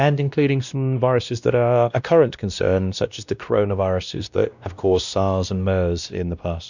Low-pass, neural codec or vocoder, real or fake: 7.2 kHz; codec, 16 kHz, 1 kbps, X-Codec, WavLM features, trained on Multilingual LibriSpeech; fake